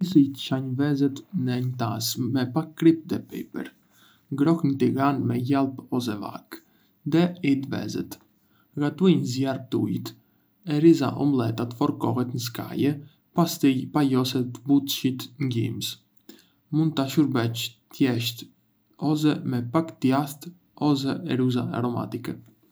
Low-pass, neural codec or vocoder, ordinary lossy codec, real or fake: none; none; none; real